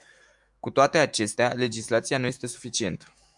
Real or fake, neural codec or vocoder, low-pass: fake; autoencoder, 48 kHz, 128 numbers a frame, DAC-VAE, trained on Japanese speech; 10.8 kHz